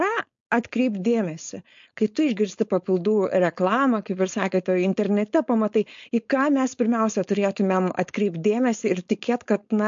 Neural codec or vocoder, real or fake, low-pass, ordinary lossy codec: codec, 16 kHz, 4.8 kbps, FACodec; fake; 7.2 kHz; MP3, 48 kbps